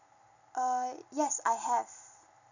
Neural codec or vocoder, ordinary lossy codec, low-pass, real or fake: none; none; 7.2 kHz; real